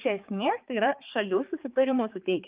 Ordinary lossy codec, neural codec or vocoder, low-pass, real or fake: Opus, 32 kbps; codec, 16 kHz, 4 kbps, X-Codec, HuBERT features, trained on balanced general audio; 3.6 kHz; fake